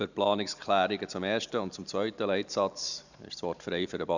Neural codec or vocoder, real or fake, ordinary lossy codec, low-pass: vocoder, 44.1 kHz, 128 mel bands every 512 samples, BigVGAN v2; fake; none; 7.2 kHz